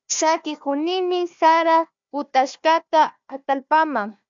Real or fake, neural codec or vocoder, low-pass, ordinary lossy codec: fake; codec, 16 kHz, 1 kbps, FunCodec, trained on Chinese and English, 50 frames a second; 7.2 kHz; MP3, 64 kbps